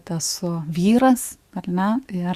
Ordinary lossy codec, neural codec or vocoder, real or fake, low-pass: Opus, 64 kbps; autoencoder, 48 kHz, 128 numbers a frame, DAC-VAE, trained on Japanese speech; fake; 14.4 kHz